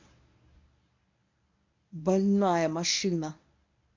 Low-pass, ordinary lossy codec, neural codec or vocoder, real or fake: 7.2 kHz; MP3, 64 kbps; codec, 24 kHz, 0.9 kbps, WavTokenizer, medium speech release version 1; fake